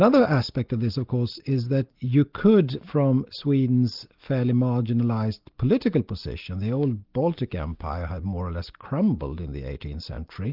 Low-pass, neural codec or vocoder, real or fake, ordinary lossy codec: 5.4 kHz; none; real; Opus, 32 kbps